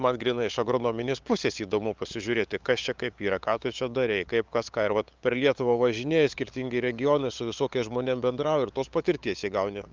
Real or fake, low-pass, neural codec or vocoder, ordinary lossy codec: fake; 7.2 kHz; codec, 16 kHz, 8 kbps, FunCodec, trained on LibriTTS, 25 frames a second; Opus, 24 kbps